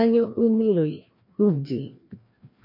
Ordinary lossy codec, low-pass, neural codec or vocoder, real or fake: MP3, 32 kbps; 5.4 kHz; codec, 16 kHz, 1 kbps, FreqCodec, larger model; fake